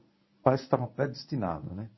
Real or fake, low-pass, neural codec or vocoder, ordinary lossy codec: fake; 7.2 kHz; codec, 24 kHz, 0.9 kbps, WavTokenizer, medium speech release version 1; MP3, 24 kbps